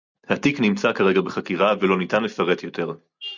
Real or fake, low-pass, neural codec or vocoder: real; 7.2 kHz; none